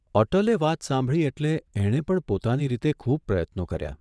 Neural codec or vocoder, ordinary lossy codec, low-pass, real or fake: vocoder, 22.05 kHz, 80 mel bands, WaveNeXt; none; none; fake